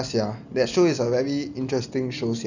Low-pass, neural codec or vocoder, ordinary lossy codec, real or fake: 7.2 kHz; none; none; real